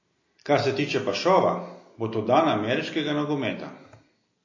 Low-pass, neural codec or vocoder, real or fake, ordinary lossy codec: 7.2 kHz; none; real; MP3, 32 kbps